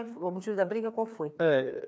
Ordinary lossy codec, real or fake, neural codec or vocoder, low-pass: none; fake; codec, 16 kHz, 2 kbps, FreqCodec, larger model; none